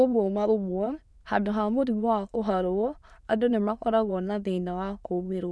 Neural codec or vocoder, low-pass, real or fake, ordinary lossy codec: autoencoder, 22.05 kHz, a latent of 192 numbers a frame, VITS, trained on many speakers; none; fake; none